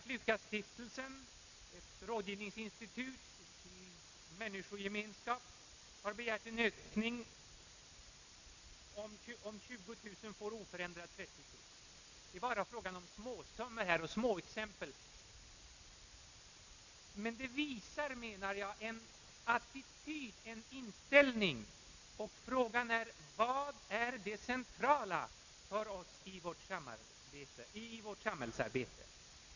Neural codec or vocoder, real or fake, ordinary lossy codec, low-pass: none; real; none; 7.2 kHz